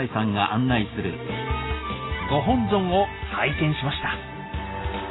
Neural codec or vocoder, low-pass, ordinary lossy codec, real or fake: none; 7.2 kHz; AAC, 16 kbps; real